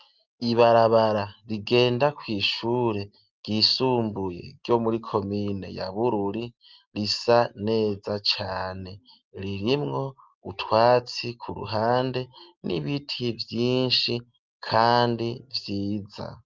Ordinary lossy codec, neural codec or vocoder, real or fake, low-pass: Opus, 24 kbps; none; real; 7.2 kHz